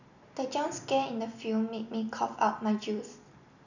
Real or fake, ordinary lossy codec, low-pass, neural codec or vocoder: real; none; 7.2 kHz; none